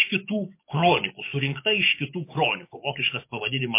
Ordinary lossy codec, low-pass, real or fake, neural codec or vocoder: MP3, 24 kbps; 3.6 kHz; fake; codec, 44.1 kHz, 7.8 kbps, DAC